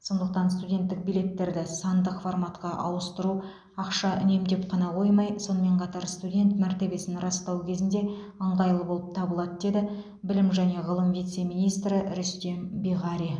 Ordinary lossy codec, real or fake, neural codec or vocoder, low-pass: none; real; none; 9.9 kHz